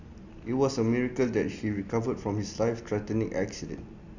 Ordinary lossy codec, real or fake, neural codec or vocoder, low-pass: none; real; none; 7.2 kHz